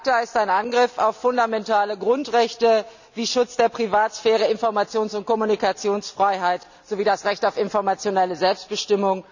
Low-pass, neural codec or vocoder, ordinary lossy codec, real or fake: 7.2 kHz; none; none; real